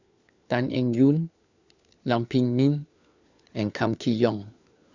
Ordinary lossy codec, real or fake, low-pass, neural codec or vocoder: Opus, 64 kbps; fake; 7.2 kHz; codec, 16 kHz, 4 kbps, FunCodec, trained on LibriTTS, 50 frames a second